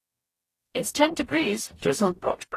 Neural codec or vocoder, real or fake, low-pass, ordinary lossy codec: codec, 44.1 kHz, 0.9 kbps, DAC; fake; 14.4 kHz; AAC, 48 kbps